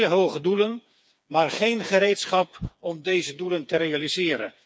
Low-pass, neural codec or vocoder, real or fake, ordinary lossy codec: none; codec, 16 kHz, 4 kbps, FreqCodec, smaller model; fake; none